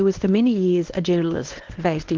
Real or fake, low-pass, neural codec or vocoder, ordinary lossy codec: fake; 7.2 kHz; codec, 24 kHz, 0.9 kbps, WavTokenizer, medium speech release version 1; Opus, 16 kbps